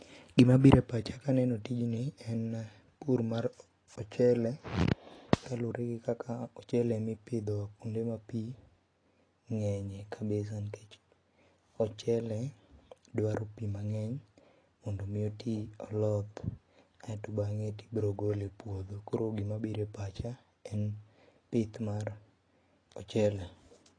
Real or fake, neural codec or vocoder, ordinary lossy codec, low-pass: real; none; AAC, 32 kbps; 9.9 kHz